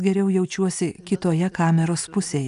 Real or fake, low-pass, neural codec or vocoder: real; 10.8 kHz; none